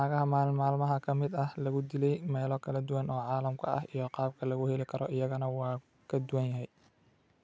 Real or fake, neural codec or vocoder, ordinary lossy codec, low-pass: real; none; none; none